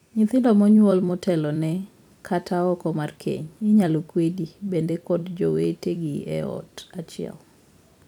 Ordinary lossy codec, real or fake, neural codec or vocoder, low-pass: none; fake; vocoder, 44.1 kHz, 128 mel bands every 256 samples, BigVGAN v2; 19.8 kHz